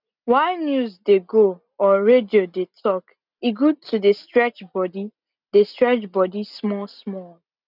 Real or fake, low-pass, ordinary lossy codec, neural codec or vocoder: real; 5.4 kHz; none; none